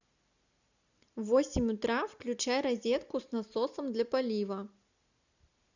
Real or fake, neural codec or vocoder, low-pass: real; none; 7.2 kHz